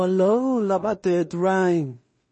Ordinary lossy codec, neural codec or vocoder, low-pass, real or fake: MP3, 32 kbps; codec, 16 kHz in and 24 kHz out, 0.4 kbps, LongCat-Audio-Codec, two codebook decoder; 10.8 kHz; fake